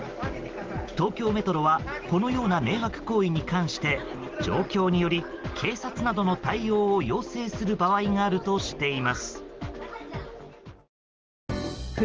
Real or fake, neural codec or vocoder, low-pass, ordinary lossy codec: real; none; 7.2 kHz; Opus, 16 kbps